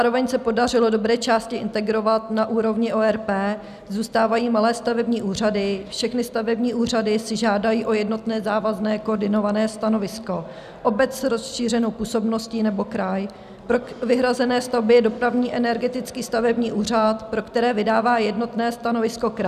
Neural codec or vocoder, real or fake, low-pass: none; real; 14.4 kHz